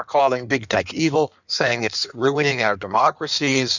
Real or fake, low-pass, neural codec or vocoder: fake; 7.2 kHz; codec, 16 kHz in and 24 kHz out, 1.1 kbps, FireRedTTS-2 codec